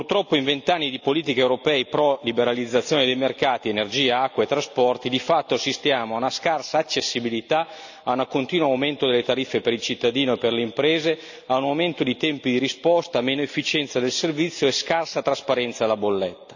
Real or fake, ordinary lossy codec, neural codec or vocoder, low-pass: real; none; none; 7.2 kHz